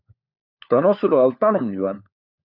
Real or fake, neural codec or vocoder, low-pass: fake; codec, 16 kHz, 4 kbps, FunCodec, trained on LibriTTS, 50 frames a second; 5.4 kHz